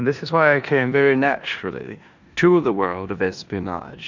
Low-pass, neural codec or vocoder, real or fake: 7.2 kHz; codec, 16 kHz in and 24 kHz out, 0.9 kbps, LongCat-Audio-Codec, four codebook decoder; fake